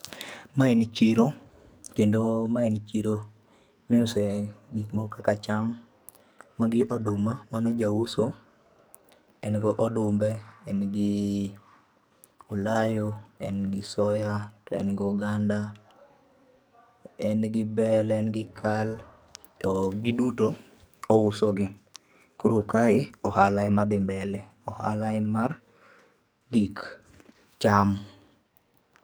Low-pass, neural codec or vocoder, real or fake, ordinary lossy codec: none; codec, 44.1 kHz, 2.6 kbps, SNAC; fake; none